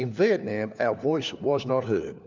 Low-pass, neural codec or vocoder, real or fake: 7.2 kHz; codec, 16 kHz, 4 kbps, FunCodec, trained on LibriTTS, 50 frames a second; fake